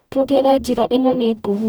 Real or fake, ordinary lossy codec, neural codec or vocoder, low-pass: fake; none; codec, 44.1 kHz, 0.9 kbps, DAC; none